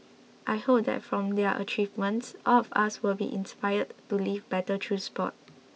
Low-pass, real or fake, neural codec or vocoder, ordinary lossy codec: none; real; none; none